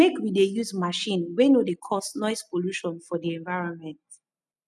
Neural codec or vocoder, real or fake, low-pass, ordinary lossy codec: none; real; none; none